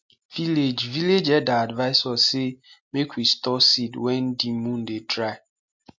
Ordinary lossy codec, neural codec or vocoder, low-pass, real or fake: MP3, 48 kbps; none; 7.2 kHz; real